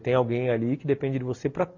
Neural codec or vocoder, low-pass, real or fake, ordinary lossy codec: none; 7.2 kHz; real; MP3, 48 kbps